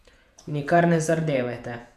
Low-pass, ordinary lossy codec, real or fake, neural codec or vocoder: 14.4 kHz; none; fake; vocoder, 48 kHz, 128 mel bands, Vocos